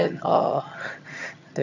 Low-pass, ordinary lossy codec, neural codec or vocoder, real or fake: 7.2 kHz; none; vocoder, 22.05 kHz, 80 mel bands, HiFi-GAN; fake